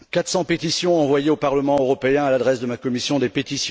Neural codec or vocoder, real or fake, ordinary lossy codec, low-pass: none; real; none; none